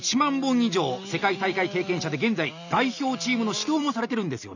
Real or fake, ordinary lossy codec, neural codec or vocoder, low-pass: real; none; none; 7.2 kHz